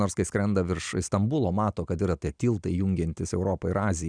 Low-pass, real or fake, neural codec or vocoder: 9.9 kHz; real; none